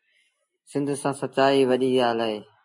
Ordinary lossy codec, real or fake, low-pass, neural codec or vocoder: MP3, 48 kbps; real; 10.8 kHz; none